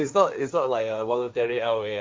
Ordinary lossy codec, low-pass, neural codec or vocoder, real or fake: none; none; codec, 16 kHz, 1.1 kbps, Voila-Tokenizer; fake